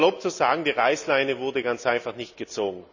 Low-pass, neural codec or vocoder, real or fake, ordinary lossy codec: 7.2 kHz; none; real; none